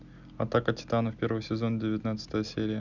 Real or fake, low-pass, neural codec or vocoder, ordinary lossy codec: real; 7.2 kHz; none; none